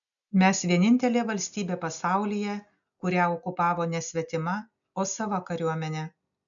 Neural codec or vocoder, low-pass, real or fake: none; 7.2 kHz; real